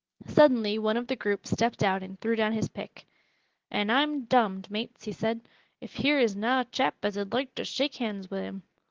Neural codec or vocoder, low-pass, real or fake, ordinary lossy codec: none; 7.2 kHz; real; Opus, 16 kbps